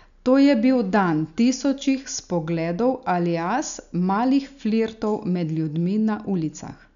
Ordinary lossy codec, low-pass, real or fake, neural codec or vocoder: none; 7.2 kHz; real; none